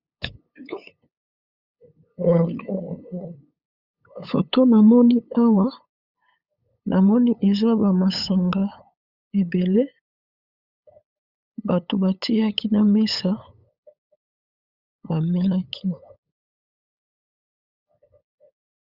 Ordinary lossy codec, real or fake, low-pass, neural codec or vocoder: Opus, 64 kbps; fake; 5.4 kHz; codec, 16 kHz, 8 kbps, FunCodec, trained on LibriTTS, 25 frames a second